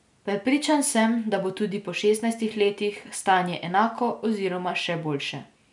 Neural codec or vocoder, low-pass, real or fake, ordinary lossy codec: none; 10.8 kHz; real; none